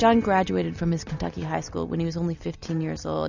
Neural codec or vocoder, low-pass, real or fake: none; 7.2 kHz; real